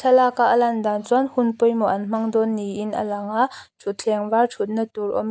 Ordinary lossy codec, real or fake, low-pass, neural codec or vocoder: none; real; none; none